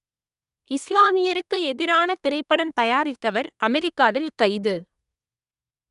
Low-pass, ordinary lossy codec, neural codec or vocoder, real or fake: 10.8 kHz; none; codec, 24 kHz, 1 kbps, SNAC; fake